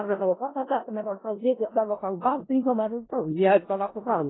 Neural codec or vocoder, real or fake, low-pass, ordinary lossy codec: codec, 16 kHz in and 24 kHz out, 0.4 kbps, LongCat-Audio-Codec, four codebook decoder; fake; 7.2 kHz; AAC, 16 kbps